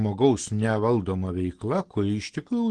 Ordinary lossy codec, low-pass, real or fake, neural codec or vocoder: Opus, 16 kbps; 9.9 kHz; real; none